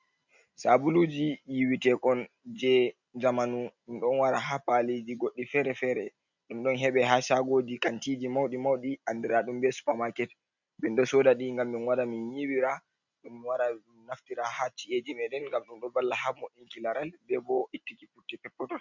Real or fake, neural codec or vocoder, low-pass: real; none; 7.2 kHz